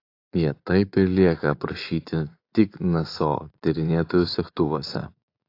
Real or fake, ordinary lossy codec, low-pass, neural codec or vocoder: fake; AAC, 32 kbps; 5.4 kHz; vocoder, 24 kHz, 100 mel bands, Vocos